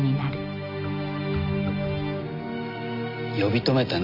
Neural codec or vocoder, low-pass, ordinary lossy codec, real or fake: none; 5.4 kHz; Opus, 64 kbps; real